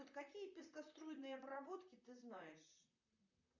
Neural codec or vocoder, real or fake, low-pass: vocoder, 44.1 kHz, 128 mel bands, Pupu-Vocoder; fake; 7.2 kHz